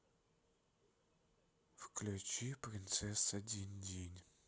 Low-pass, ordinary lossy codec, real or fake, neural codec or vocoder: none; none; real; none